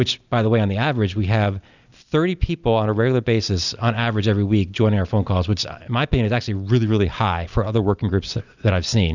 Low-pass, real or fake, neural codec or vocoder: 7.2 kHz; real; none